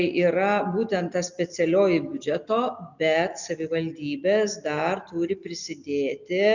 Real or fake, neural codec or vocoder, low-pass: real; none; 7.2 kHz